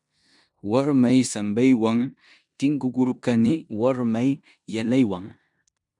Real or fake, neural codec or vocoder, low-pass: fake; codec, 16 kHz in and 24 kHz out, 0.9 kbps, LongCat-Audio-Codec, four codebook decoder; 10.8 kHz